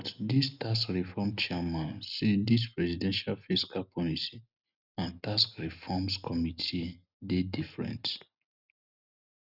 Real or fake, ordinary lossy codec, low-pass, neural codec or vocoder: real; none; 5.4 kHz; none